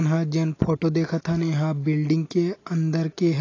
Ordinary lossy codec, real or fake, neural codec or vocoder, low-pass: AAC, 32 kbps; real; none; 7.2 kHz